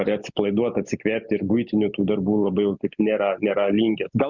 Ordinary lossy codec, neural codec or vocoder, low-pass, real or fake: Opus, 64 kbps; none; 7.2 kHz; real